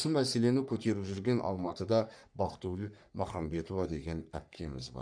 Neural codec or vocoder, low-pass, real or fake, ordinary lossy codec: codec, 44.1 kHz, 3.4 kbps, Pupu-Codec; 9.9 kHz; fake; none